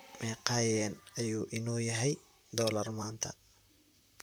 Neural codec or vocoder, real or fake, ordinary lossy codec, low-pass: vocoder, 44.1 kHz, 128 mel bands, Pupu-Vocoder; fake; none; none